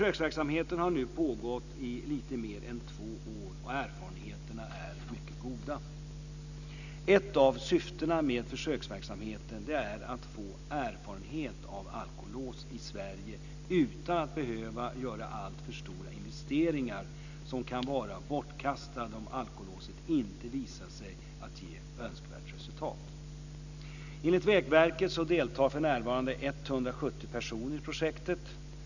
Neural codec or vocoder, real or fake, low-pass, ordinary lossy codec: none; real; 7.2 kHz; none